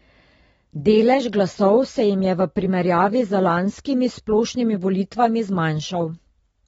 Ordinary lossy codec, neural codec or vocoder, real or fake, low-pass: AAC, 24 kbps; none; real; 10.8 kHz